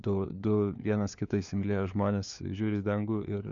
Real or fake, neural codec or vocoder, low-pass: fake; codec, 16 kHz, 4 kbps, FunCodec, trained on LibriTTS, 50 frames a second; 7.2 kHz